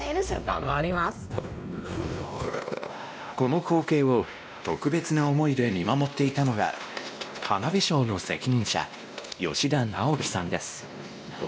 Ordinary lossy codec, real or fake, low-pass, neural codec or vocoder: none; fake; none; codec, 16 kHz, 1 kbps, X-Codec, WavLM features, trained on Multilingual LibriSpeech